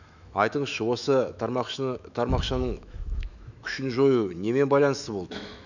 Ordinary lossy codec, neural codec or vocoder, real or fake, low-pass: none; autoencoder, 48 kHz, 128 numbers a frame, DAC-VAE, trained on Japanese speech; fake; 7.2 kHz